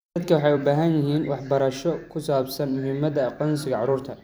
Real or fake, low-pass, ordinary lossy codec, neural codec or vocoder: fake; none; none; vocoder, 44.1 kHz, 128 mel bands every 256 samples, BigVGAN v2